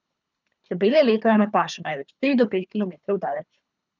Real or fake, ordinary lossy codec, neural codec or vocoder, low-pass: fake; none; codec, 24 kHz, 3 kbps, HILCodec; 7.2 kHz